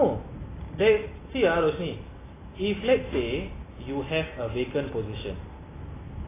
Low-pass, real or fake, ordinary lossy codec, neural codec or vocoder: 3.6 kHz; real; AAC, 16 kbps; none